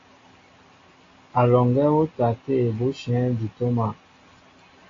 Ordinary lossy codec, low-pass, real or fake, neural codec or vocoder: AAC, 32 kbps; 7.2 kHz; real; none